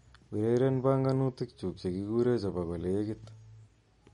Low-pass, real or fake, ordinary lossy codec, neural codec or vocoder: 10.8 kHz; real; MP3, 48 kbps; none